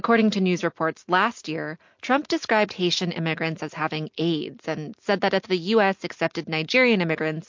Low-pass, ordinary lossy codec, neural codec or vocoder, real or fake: 7.2 kHz; MP3, 48 kbps; none; real